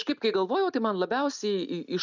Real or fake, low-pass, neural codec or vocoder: real; 7.2 kHz; none